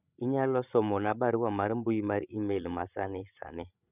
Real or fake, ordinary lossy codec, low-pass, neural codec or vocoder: fake; none; 3.6 kHz; codec, 16 kHz, 16 kbps, FreqCodec, larger model